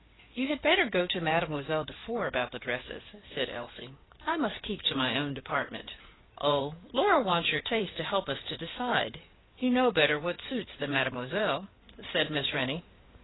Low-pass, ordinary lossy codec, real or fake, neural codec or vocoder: 7.2 kHz; AAC, 16 kbps; fake; codec, 16 kHz in and 24 kHz out, 2.2 kbps, FireRedTTS-2 codec